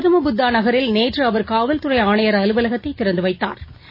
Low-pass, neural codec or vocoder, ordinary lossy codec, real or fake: 5.4 kHz; none; MP3, 24 kbps; real